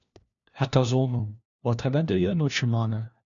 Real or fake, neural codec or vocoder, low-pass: fake; codec, 16 kHz, 1 kbps, FunCodec, trained on LibriTTS, 50 frames a second; 7.2 kHz